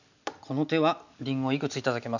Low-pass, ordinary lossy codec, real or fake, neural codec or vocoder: 7.2 kHz; none; real; none